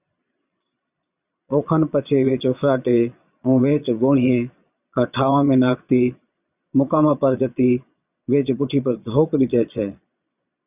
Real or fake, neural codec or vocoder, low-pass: fake; vocoder, 22.05 kHz, 80 mel bands, Vocos; 3.6 kHz